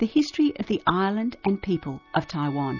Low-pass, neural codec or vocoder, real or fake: 7.2 kHz; none; real